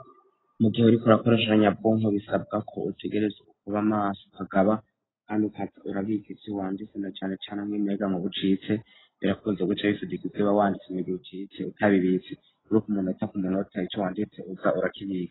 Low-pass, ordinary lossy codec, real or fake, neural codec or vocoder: 7.2 kHz; AAC, 16 kbps; real; none